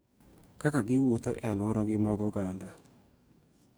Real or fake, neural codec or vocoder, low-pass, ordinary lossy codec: fake; codec, 44.1 kHz, 2.6 kbps, DAC; none; none